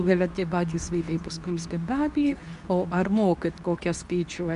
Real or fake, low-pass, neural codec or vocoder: fake; 10.8 kHz; codec, 24 kHz, 0.9 kbps, WavTokenizer, medium speech release version 2